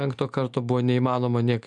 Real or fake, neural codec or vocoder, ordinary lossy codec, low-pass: real; none; MP3, 96 kbps; 10.8 kHz